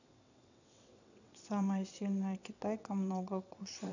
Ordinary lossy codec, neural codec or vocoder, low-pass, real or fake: none; vocoder, 22.05 kHz, 80 mel bands, WaveNeXt; 7.2 kHz; fake